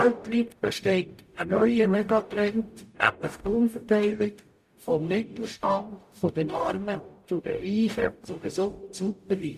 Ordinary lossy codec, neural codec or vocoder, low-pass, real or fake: Opus, 64 kbps; codec, 44.1 kHz, 0.9 kbps, DAC; 14.4 kHz; fake